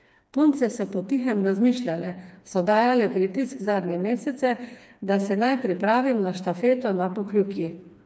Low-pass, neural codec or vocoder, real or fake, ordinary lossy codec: none; codec, 16 kHz, 2 kbps, FreqCodec, smaller model; fake; none